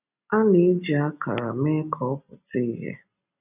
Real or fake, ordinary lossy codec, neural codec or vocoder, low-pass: real; none; none; 3.6 kHz